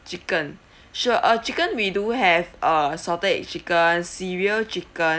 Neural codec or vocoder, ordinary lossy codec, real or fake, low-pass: none; none; real; none